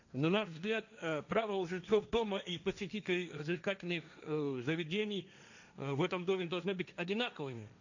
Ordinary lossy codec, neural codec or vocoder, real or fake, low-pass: none; codec, 16 kHz, 1.1 kbps, Voila-Tokenizer; fake; 7.2 kHz